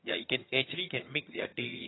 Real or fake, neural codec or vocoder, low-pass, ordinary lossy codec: fake; vocoder, 22.05 kHz, 80 mel bands, HiFi-GAN; 7.2 kHz; AAC, 16 kbps